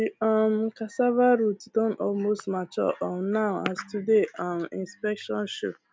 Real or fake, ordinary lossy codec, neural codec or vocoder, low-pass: real; none; none; none